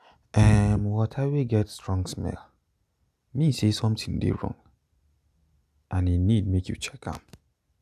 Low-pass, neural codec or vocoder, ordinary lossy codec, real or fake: 14.4 kHz; none; none; real